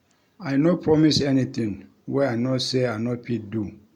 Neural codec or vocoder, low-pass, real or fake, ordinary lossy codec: none; 19.8 kHz; real; MP3, 96 kbps